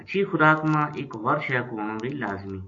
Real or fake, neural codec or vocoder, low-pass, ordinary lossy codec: real; none; 7.2 kHz; AAC, 48 kbps